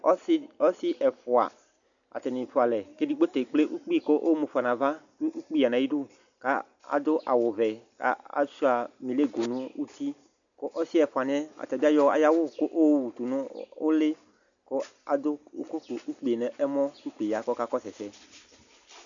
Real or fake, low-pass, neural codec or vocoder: real; 7.2 kHz; none